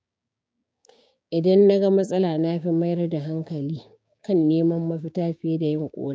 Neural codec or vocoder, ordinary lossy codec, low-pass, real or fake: codec, 16 kHz, 6 kbps, DAC; none; none; fake